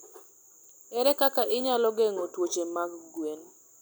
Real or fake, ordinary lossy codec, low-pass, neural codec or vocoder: real; none; none; none